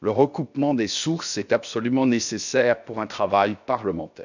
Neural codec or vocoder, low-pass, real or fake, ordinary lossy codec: codec, 16 kHz, about 1 kbps, DyCAST, with the encoder's durations; 7.2 kHz; fake; none